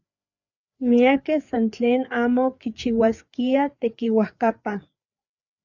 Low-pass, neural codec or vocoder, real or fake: 7.2 kHz; codec, 16 kHz, 4 kbps, FreqCodec, larger model; fake